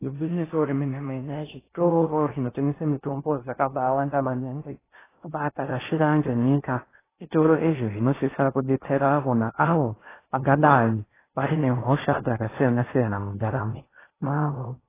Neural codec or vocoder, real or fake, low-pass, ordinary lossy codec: codec, 16 kHz in and 24 kHz out, 0.6 kbps, FocalCodec, streaming, 4096 codes; fake; 3.6 kHz; AAC, 16 kbps